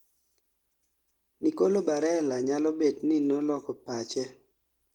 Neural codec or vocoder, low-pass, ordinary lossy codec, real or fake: none; 19.8 kHz; Opus, 24 kbps; real